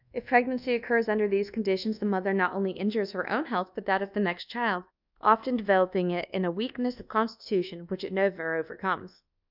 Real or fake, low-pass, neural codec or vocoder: fake; 5.4 kHz; codec, 24 kHz, 1.2 kbps, DualCodec